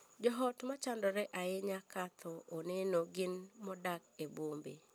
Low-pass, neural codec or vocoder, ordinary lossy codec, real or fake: none; none; none; real